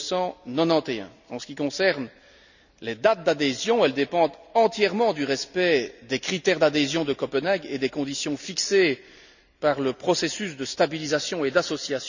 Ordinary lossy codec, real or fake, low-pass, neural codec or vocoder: none; real; 7.2 kHz; none